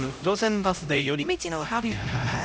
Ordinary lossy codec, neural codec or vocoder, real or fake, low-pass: none; codec, 16 kHz, 0.5 kbps, X-Codec, HuBERT features, trained on LibriSpeech; fake; none